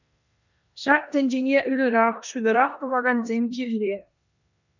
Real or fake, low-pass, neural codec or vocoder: fake; 7.2 kHz; codec, 16 kHz in and 24 kHz out, 0.9 kbps, LongCat-Audio-Codec, four codebook decoder